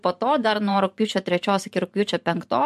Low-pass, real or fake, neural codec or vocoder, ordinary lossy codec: 14.4 kHz; fake; vocoder, 44.1 kHz, 128 mel bands every 512 samples, BigVGAN v2; MP3, 64 kbps